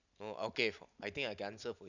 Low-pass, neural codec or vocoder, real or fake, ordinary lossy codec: 7.2 kHz; none; real; none